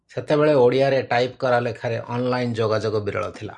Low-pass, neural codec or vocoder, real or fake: 10.8 kHz; none; real